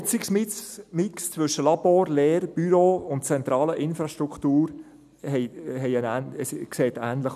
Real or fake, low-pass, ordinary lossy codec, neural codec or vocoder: real; 14.4 kHz; none; none